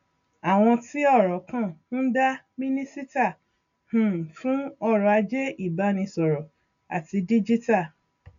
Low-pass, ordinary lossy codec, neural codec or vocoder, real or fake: 7.2 kHz; none; none; real